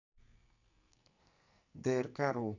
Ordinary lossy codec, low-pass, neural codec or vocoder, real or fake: none; 7.2 kHz; codec, 44.1 kHz, 2.6 kbps, SNAC; fake